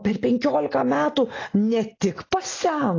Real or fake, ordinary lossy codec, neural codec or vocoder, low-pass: real; AAC, 32 kbps; none; 7.2 kHz